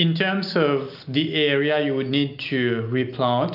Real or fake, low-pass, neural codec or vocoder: real; 5.4 kHz; none